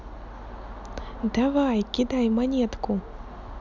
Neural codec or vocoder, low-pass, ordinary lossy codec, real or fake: none; 7.2 kHz; none; real